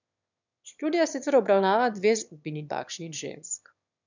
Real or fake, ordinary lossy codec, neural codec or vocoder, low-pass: fake; none; autoencoder, 22.05 kHz, a latent of 192 numbers a frame, VITS, trained on one speaker; 7.2 kHz